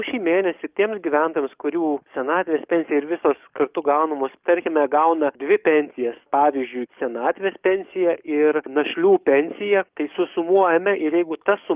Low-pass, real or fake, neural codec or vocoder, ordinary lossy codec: 3.6 kHz; fake; codec, 44.1 kHz, 7.8 kbps, DAC; Opus, 24 kbps